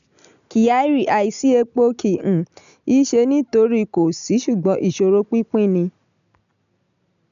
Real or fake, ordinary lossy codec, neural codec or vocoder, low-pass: real; none; none; 7.2 kHz